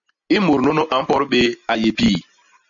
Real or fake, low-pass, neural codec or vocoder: real; 7.2 kHz; none